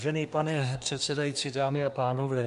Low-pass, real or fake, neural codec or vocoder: 10.8 kHz; fake; codec, 24 kHz, 1 kbps, SNAC